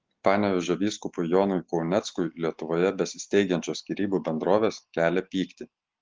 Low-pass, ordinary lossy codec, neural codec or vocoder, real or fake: 7.2 kHz; Opus, 16 kbps; none; real